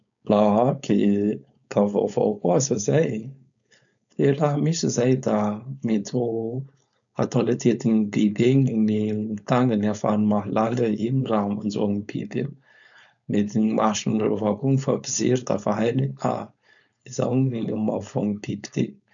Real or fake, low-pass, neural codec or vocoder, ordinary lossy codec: fake; 7.2 kHz; codec, 16 kHz, 4.8 kbps, FACodec; none